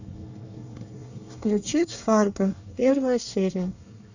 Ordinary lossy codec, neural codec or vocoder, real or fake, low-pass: none; codec, 24 kHz, 1 kbps, SNAC; fake; 7.2 kHz